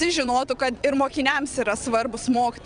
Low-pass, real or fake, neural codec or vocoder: 9.9 kHz; fake; vocoder, 22.05 kHz, 80 mel bands, WaveNeXt